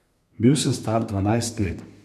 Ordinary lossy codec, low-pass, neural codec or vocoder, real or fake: none; 14.4 kHz; codec, 32 kHz, 1.9 kbps, SNAC; fake